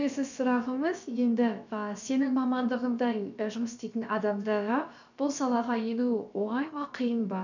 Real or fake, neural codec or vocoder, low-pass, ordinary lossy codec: fake; codec, 16 kHz, about 1 kbps, DyCAST, with the encoder's durations; 7.2 kHz; none